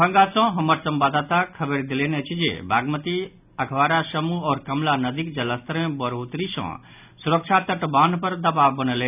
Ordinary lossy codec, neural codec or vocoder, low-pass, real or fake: none; none; 3.6 kHz; real